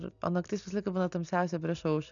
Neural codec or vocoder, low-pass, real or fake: none; 7.2 kHz; real